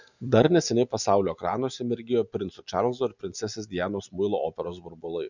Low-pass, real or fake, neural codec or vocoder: 7.2 kHz; real; none